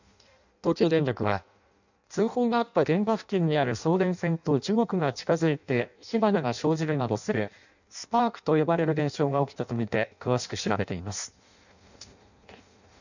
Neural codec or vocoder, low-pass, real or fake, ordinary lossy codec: codec, 16 kHz in and 24 kHz out, 0.6 kbps, FireRedTTS-2 codec; 7.2 kHz; fake; none